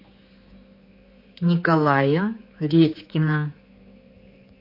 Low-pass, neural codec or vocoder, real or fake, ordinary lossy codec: 5.4 kHz; codec, 16 kHz, 4 kbps, X-Codec, HuBERT features, trained on general audio; fake; MP3, 32 kbps